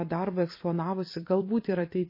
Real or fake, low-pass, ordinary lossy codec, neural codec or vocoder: fake; 5.4 kHz; MP3, 24 kbps; vocoder, 44.1 kHz, 128 mel bands every 256 samples, BigVGAN v2